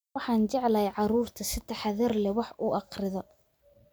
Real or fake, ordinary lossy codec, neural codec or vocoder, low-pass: real; none; none; none